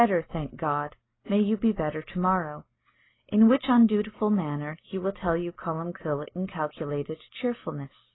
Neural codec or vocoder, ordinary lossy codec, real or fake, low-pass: none; AAC, 16 kbps; real; 7.2 kHz